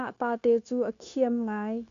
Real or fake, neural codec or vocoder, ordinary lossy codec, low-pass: fake; codec, 16 kHz, 0.9 kbps, LongCat-Audio-Codec; AAC, 96 kbps; 7.2 kHz